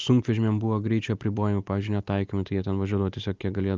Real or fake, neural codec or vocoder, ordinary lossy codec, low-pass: real; none; Opus, 24 kbps; 7.2 kHz